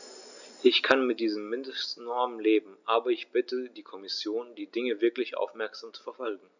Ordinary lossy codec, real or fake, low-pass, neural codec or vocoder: none; real; 7.2 kHz; none